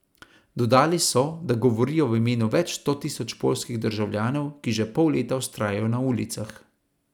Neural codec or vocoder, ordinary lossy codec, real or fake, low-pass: none; none; real; 19.8 kHz